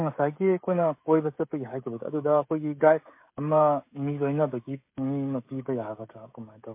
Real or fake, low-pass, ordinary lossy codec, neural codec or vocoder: fake; 3.6 kHz; MP3, 24 kbps; codec, 16 kHz, 16 kbps, FreqCodec, smaller model